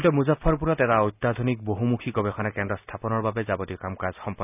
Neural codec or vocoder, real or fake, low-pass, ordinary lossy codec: none; real; 3.6 kHz; none